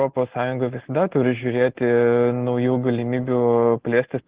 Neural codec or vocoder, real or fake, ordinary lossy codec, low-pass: none; real; Opus, 16 kbps; 3.6 kHz